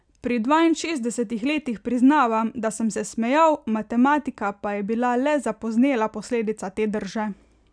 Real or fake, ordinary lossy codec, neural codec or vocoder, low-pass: real; none; none; 9.9 kHz